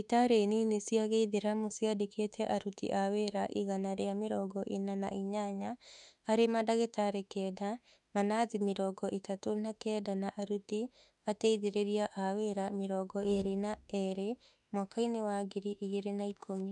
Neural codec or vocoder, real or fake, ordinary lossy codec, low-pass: autoencoder, 48 kHz, 32 numbers a frame, DAC-VAE, trained on Japanese speech; fake; none; 10.8 kHz